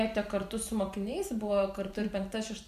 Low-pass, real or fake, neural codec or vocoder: 14.4 kHz; fake; vocoder, 44.1 kHz, 128 mel bands every 512 samples, BigVGAN v2